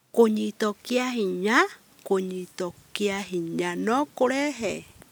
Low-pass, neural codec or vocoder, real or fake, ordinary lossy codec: none; none; real; none